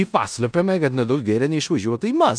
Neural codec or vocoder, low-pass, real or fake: codec, 16 kHz in and 24 kHz out, 0.9 kbps, LongCat-Audio-Codec, four codebook decoder; 9.9 kHz; fake